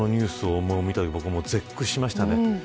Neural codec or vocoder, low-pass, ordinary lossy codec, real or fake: none; none; none; real